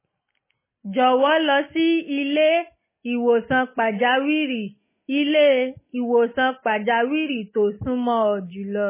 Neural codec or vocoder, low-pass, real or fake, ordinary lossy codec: none; 3.6 kHz; real; MP3, 16 kbps